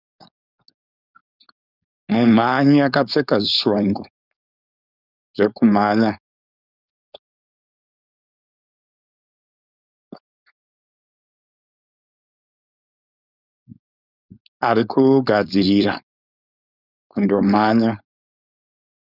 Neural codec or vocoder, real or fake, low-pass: codec, 16 kHz, 4.8 kbps, FACodec; fake; 5.4 kHz